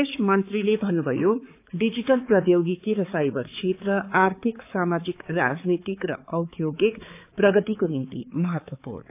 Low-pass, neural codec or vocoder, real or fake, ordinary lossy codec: 3.6 kHz; codec, 16 kHz, 4 kbps, X-Codec, HuBERT features, trained on balanced general audio; fake; AAC, 24 kbps